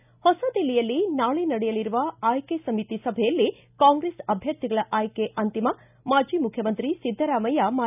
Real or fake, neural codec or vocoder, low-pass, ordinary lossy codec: real; none; 3.6 kHz; none